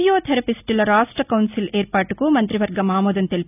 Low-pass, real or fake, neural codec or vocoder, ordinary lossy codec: 3.6 kHz; real; none; none